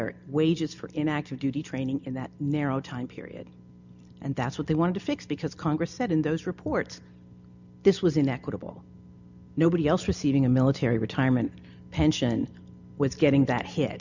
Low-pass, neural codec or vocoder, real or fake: 7.2 kHz; none; real